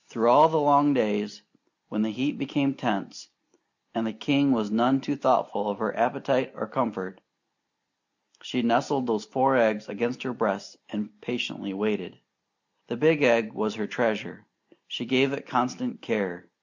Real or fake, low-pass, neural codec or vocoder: real; 7.2 kHz; none